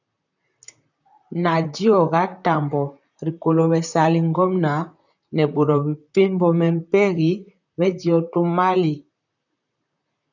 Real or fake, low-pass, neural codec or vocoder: fake; 7.2 kHz; vocoder, 44.1 kHz, 128 mel bands, Pupu-Vocoder